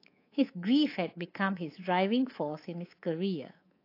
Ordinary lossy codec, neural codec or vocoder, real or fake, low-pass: AAC, 32 kbps; codec, 24 kHz, 3.1 kbps, DualCodec; fake; 5.4 kHz